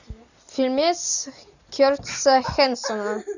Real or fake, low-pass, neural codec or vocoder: real; 7.2 kHz; none